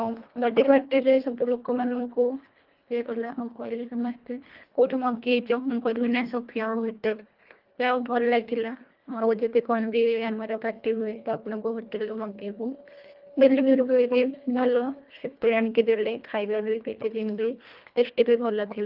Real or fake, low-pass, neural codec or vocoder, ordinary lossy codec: fake; 5.4 kHz; codec, 24 kHz, 1.5 kbps, HILCodec; Opus, 32 kbps